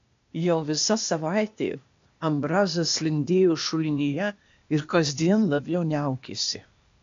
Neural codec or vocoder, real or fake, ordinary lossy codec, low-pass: codec, 16 kHz, 0.8 kbps, ZipCodec; fake; AAC, 64 kbps; 7.2 kHz